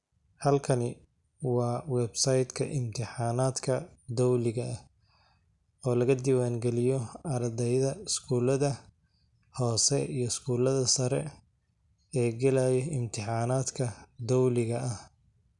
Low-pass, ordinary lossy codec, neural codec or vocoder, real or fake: 10.8 kHz; none; none; real